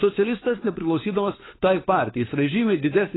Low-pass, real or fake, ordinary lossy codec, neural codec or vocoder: 7.2 kHz; fake; AAC, 16 kbps; codec, 16 kHz, 4 kbps, FunCodec, trained on LibriTTS, 50 frames a second